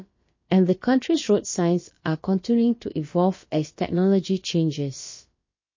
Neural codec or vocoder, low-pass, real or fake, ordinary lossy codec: codec, 16 kHz, about 1 kbps, DyCAST, with the encoder's durations; 7.2 kHz; fake; MP3, 32 kbps